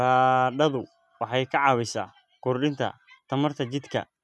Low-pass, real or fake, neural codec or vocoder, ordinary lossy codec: none; real; none; none